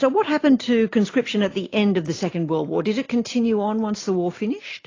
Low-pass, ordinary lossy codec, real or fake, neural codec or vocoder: 7.2 kHz; AAC, 32 kbps; real; none